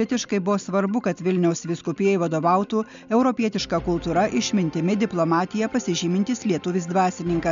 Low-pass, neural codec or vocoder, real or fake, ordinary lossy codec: 7.2 kHz; none; real; MP3, 64 kbps